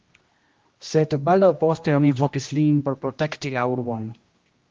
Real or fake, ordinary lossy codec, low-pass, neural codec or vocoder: fake; Opus, 32 kbps; 7.2 kHz; codec, 16 kHz, 1 kbps, X-Codec, HuBERT features, trained on general audio